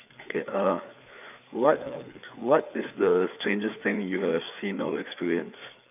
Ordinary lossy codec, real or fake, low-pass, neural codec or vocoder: none; fake; 3.6 kHz; codec, 16 kHz, 4 kbps, FreqCodec, larger model